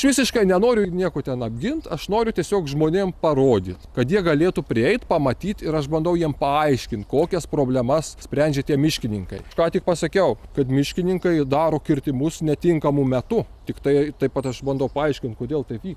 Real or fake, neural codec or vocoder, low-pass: real; none; 14.4 kHz